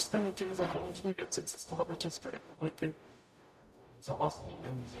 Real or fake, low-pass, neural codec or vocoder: fake; 14.4 kHz; codec, 44.1 kHz, 0.9 kbps, DAC